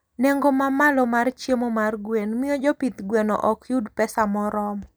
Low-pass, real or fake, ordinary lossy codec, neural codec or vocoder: none; real; none; none